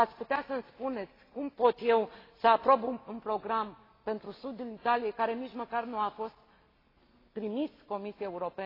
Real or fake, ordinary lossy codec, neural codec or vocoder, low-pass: real; AAC, 24 kbps; none; 5.4 kHz